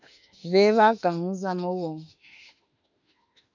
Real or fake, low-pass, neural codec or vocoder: fake; 7.2 kHz; autoencoder, 48 kHz, 32 numbers a frame, DAC-VAE, trained on Japanese speech